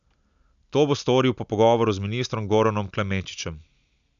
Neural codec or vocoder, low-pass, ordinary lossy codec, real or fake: none; 7.2 kHz; none; real